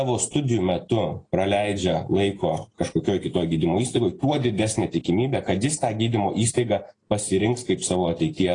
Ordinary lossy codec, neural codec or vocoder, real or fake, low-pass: AAC, 32 kbps; none; real; 9.9 kHz